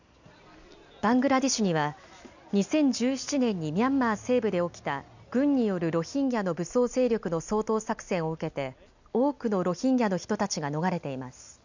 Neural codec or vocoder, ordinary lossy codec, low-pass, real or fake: none; none; 7.2 kHz; real